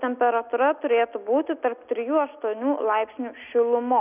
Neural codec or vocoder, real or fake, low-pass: none; real; 3.6 kHz